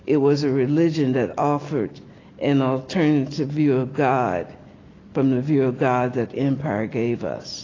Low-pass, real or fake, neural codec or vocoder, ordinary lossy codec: 7.2 kHz; real; none; AAC, 32 kbps